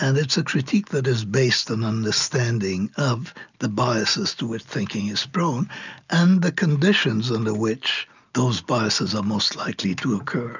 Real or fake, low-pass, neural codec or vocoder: real; 7.2 kHz; none